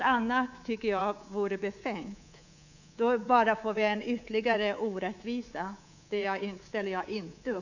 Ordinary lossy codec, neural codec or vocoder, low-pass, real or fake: none; codec, 24 kHz, 3.1 kbps, DualCodec; 7.2 kHz; fake